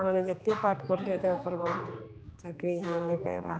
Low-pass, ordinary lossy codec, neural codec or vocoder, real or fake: none; none; codec, 16 kHz, 2 kbps, X-Codec, HuBERT features, trained on general audio; fake